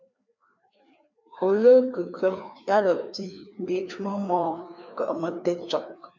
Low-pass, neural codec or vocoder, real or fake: 7.2 kHz; codec, 16 kHz, 2 kbps, FreqCodec, larger model; fake